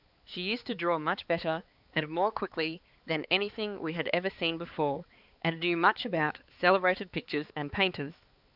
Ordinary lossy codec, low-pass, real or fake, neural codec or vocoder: Opus, 64 kbps; 5.4 kHz; fake; codec, 16 kHz, 4 kbps, X-Codec, HuBERT features, trained on balanced general audio